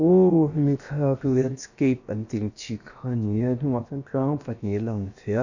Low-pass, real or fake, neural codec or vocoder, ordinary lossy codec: 7.2 kHz; fake; codec, 16 kHz, about 1 kbps, DyCAST, with the encoder's durations; none